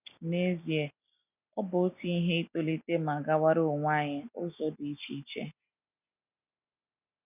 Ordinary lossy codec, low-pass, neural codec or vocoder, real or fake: none; 3.6 kHz; none; real